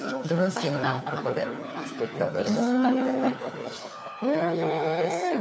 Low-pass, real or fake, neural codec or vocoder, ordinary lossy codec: none; fake; codec, 16 kHz, 4 kbps, FunCodec, trained on LibriTTS, 50 frames a second; none